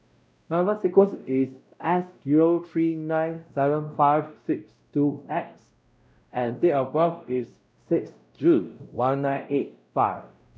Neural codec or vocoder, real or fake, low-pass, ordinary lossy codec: codec, 16 kHz, 0.5 kbps, X-Codec, WavLM features, trained on Multilingual LibriSpeech; fake; none; none